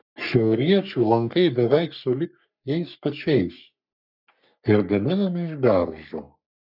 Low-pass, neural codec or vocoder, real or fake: 5.4 kHz; codec, 44.1 kHz, 3.4 kbps, Pupu-Codec; fake